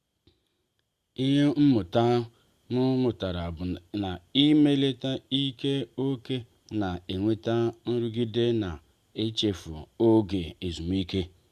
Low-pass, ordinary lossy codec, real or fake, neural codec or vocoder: 14.4 kHz; Opus, 64 kbps; real; none